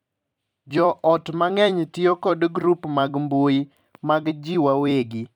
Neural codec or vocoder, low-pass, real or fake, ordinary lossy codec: vocoder, 44.1 kHz, 128 mel bands every 256 samples, BigVGAN v2; 19.8 kHz; fake; none